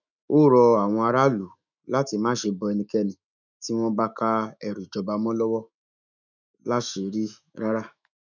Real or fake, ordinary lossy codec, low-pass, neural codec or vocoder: fake; none; 7.2 kHz; autoencoder, 48 kHz, 128 numbers a frame, DAC-VAE, trained on Japanese speech